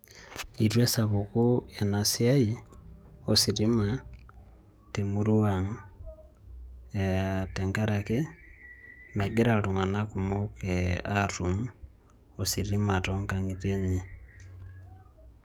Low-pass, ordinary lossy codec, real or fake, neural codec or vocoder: none; none; fake; codec, 44.1 kHz, 7.8 kbps, DAC